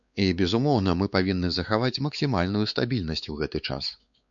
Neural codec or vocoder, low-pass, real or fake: codec, 16 kHz, 4 kbps, X-Codec, WavLM features, trained on Multilingual LibriSpeech; 7.2 kHz; fake